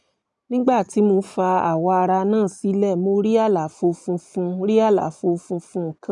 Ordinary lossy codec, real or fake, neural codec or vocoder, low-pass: none; real; none; 10.8 kHz